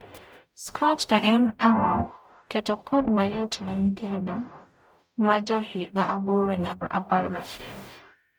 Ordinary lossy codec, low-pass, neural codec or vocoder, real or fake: none; none; codec, 44.1 kHz, 0.9 kbps, DAC; fake